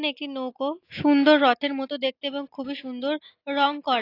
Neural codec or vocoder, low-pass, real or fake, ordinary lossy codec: none; 5.4 kHz; real; AAC, 32 kbps